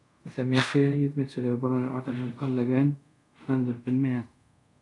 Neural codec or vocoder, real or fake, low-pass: codec, 24 kHz, 0.5 kbps, DualCodec; fake; 10.8 kHz